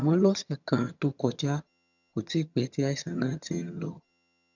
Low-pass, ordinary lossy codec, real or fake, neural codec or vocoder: 7.2 kHz; none; fake; vocoder, 22.05 kHz, 80 mel bands, HiFi-GAN